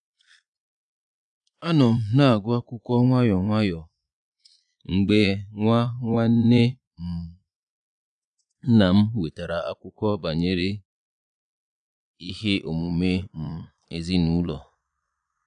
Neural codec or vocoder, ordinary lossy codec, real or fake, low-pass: vocoder, 22.05 kHz, 80 mel bands, Vocos; none; fake; 9.9 kHz